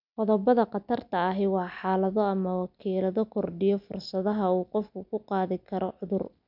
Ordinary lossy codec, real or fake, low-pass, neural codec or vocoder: none; real; 5.4 kHz; none